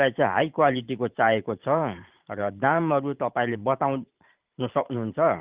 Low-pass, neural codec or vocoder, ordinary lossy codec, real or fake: 3.6 kHz; vocoder, 44.1 kHz, 128 mel bands every 512 samples, BigVGAN v2; Opus, 32 kbps; fake